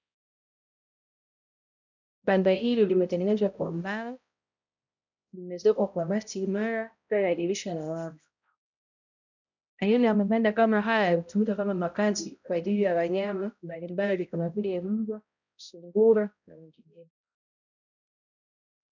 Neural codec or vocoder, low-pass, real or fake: codec, 16 kHz, 0.5 kbps, X-Codec, HuBERT features, trained on balanced general audio; 7.2 kHz; fake